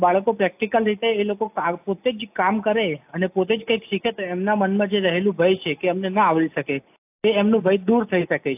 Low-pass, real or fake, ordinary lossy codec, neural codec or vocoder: 3.6 kHz; real; AAC, 32 kbps; none